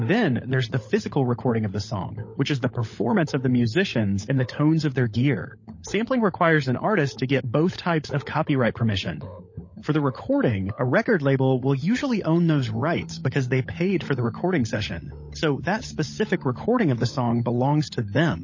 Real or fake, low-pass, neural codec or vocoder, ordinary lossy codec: fake; 7.2 kHz; codec, 16 kHz, 16 kbps, FunCodec, trained on LibriTTS, 50 frames a second; MP3, 32 kbps